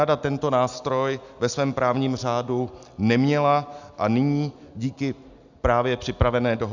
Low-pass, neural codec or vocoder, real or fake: 7.2 kHz; none; real